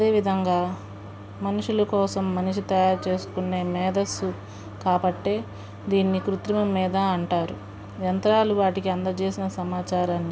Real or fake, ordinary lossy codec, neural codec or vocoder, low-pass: real; none; none; none